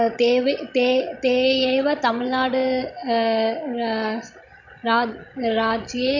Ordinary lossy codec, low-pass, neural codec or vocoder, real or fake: none; 7.2 kHz; codec, 16 kHz, 16 kbps, FreqCodec, larger model; fake